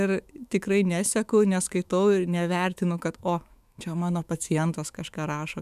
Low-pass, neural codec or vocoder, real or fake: 14.4 kHz; autoencoder, 48 kHz, 128 numbers a frame, DAC-VAE, trained on Japanese speech; fake